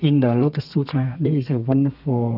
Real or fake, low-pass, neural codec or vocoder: fake; 5.4 kHz; codec, 32 kHz, 1.9 kbps, SNAC